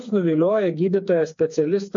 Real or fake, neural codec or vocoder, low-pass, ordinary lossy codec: fake; codec, 16 kHz, 4 kbps, FreqCodec, smaller model; 7.2 kHz; MP3, 48 kbps